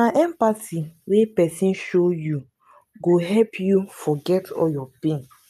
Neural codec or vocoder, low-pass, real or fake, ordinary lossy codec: none; 14.4 kHz; real; none